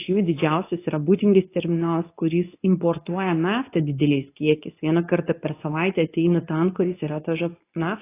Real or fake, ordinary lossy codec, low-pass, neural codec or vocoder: fake; AAC, 24 kbps; 3.6 kHz; codec, 24 kHz, 0.9 kbps, WavTokenizer, medium speech release version 2